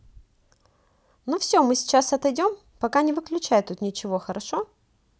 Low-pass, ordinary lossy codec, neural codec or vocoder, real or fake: none; none; none; real